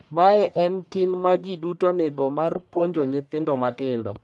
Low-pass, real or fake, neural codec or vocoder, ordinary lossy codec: 10.8 kHz; fake; codec, 44.1 kHz, 1.7 kbps, Pupu-Codec; none